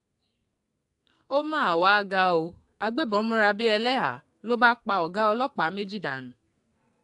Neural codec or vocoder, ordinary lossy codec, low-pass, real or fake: codec, 44.1 kHz, 2.6 kbps, SNAC; AAC, 64 kbps; 10.8 kHz; fake